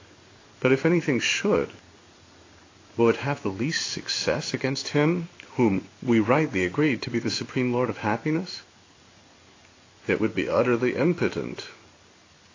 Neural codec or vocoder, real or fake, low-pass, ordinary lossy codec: codec, 16 kHz in and 24 kHz out, 1 kbps, XY-Tokenizer; fake; 7.2 kHz; AAC, 32 kbps